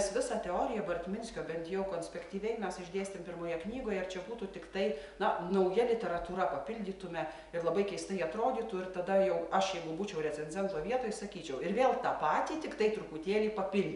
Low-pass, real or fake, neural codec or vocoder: 10.8 kHz; real; none